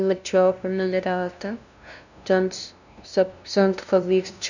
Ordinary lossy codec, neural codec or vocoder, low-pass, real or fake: none; codec, 16 kHz, 0.5 kbps, FunCodec, trained on LibriTTS, 25 frames a second; 7.2 kHz; fake